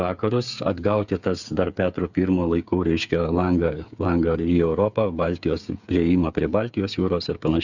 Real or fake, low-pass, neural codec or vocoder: fake; 7.2 kHz; codec, 16 kHz, 8 kbps, FreqCodec, smaller model